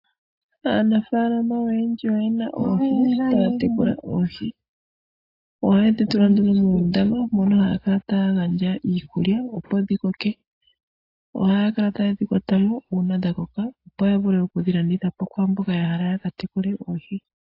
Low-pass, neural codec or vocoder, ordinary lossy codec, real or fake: 5.4 kHz; none; AAC, 32 kbps; real